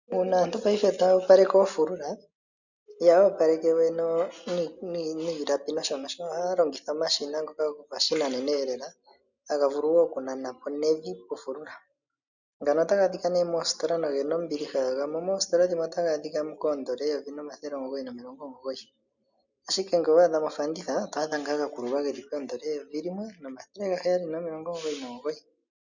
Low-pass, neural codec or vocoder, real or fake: 7.2 kHz; none; real